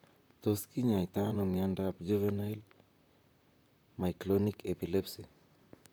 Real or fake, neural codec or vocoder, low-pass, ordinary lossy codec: fake; vocoder, 44.1 kHz, 128 mel bands, Pupu-Vocoder; none; none